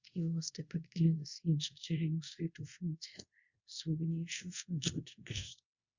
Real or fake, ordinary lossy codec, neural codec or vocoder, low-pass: fake; Opus, 64 kbps; codec, 24 kHz, 0.5 kbps, DualCodec; 7.2 kHz